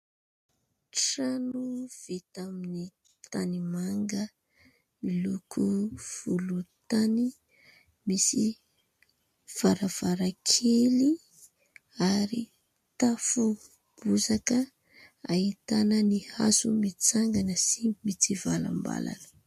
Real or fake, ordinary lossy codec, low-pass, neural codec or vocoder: real; MP3, 64 kbps; 14.4 kHz; none